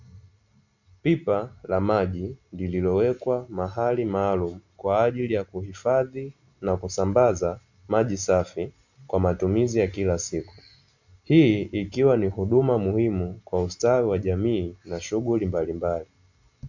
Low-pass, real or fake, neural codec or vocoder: 7.2 kHz; real; none